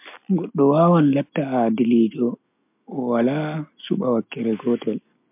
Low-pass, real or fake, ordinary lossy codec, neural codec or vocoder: 3.6 kHz; real; MP3, 32 kbps; none